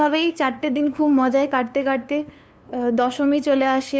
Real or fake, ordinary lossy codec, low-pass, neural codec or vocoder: fake; none; none; codec, 16 kHz, 2 kbps, FunCodec, trained on LibriTTS, 25 frames a second